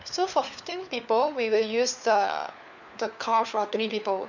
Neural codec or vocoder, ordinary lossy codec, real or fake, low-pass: codec, 16 kHz, 4 kbps, FunCodec, trained on LibriTTS, 50 frames a second; none; fake; 7.2 kHz